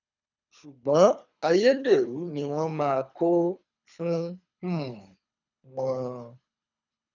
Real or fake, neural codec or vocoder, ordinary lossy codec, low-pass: fake; codec, 24 kHz, 3 kbps, HILCodec; none; 7.2 kHz